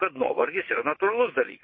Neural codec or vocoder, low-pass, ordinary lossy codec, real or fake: vocoder, 22.05 kHz, 80 mel bands, WaveNeXt; 7.2 kHz; MP3, 24 kbps; fake